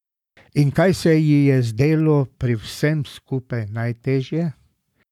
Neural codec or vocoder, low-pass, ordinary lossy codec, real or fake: codec, 44.1 kHz, 7.8 kbps, Pupu-Codec; 19.8 kHz; none; fake